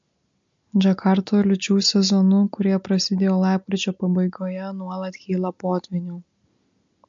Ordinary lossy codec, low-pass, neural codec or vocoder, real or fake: MP3, 48 kbps; 7.2 kHz; none; real